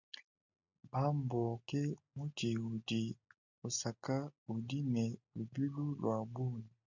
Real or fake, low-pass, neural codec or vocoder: real; 7.2 kHz; none